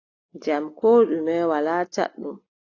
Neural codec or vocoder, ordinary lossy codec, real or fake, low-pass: none; AAC, 32 kbps; real; 7.2 kHz